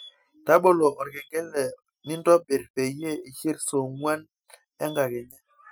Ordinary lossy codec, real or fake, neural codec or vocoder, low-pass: none; real; none; none